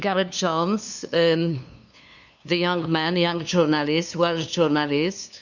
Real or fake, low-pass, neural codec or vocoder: fake; 7.2 kHz; codec, 16 kHz, 2 kbps, FunCodec, trained on LibriTTS, 25 frames a second